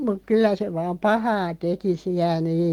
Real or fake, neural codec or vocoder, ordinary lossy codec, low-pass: real; none; Opus, 24 kbps; 19.8 kHz